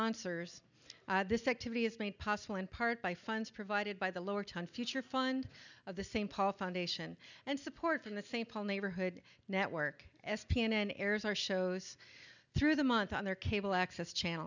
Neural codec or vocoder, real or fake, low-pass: none; real; 7.2 kHz